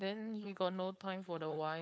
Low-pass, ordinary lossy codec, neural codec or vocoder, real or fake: none; none; codec, 16 kHz, 4.8 kbps, FACodec; fake